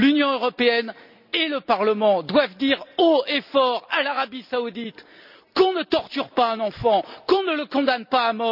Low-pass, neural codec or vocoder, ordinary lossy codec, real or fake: 5.4 kHz; none; none; real